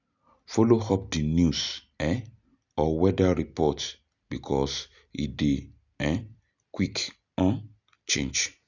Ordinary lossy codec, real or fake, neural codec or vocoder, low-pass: none; real; none; 7.2 kHz